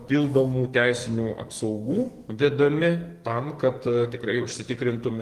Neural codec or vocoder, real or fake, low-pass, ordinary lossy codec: codec, 44.1 kHz, 2.6 kbps, SNAC; fake; 14.4 kHz; Opus, 24 kbps